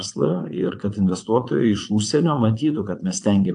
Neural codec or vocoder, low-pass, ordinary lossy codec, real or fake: vocoder, 22.05 kHz, 80 mel bands, WaveNeXt; 9.9 kHz; AAC, 64 kbps; fake